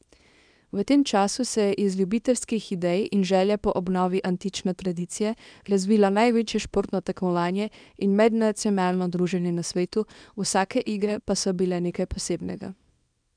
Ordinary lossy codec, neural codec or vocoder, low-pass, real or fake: none; codec, 24 kHz, 0.9 kbps, WavTokenizer, small release; 9.9 kHz; fake